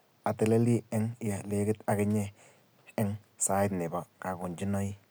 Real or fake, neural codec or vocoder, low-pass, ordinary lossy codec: real; none; none; none